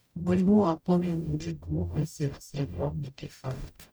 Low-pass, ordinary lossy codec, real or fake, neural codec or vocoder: none; none; fake; codec, 44.1 kHz, 0.9 kbps, DAC